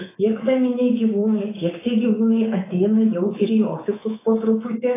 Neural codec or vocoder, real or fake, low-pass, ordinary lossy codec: codec, 24 kHz, 3.1 kbps, DualCodec; fake; 3.6 kHz; AAC, 16 kbps